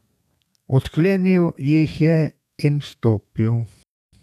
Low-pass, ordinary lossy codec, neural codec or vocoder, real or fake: 14.4 kHz; none; codec, 32 kHz, 1.9 kbps, SNAC; fake